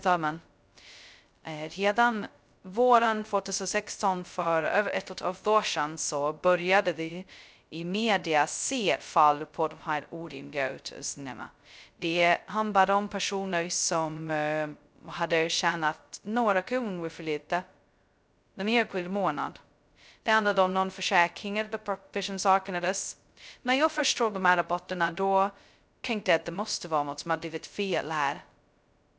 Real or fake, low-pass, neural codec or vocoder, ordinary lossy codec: fake; none; codec, 16 kHz, 0.2 kbps, FocalCodec; none